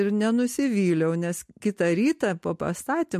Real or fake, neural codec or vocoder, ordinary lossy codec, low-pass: real; none; MP3, 64 kbps; 14.4 kHz